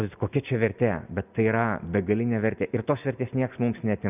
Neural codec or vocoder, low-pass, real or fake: none; 3.6 kHz; real